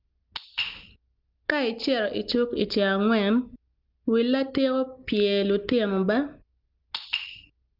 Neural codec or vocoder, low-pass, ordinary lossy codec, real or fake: none; 5.4 kHz; Opus, 24 kbps; real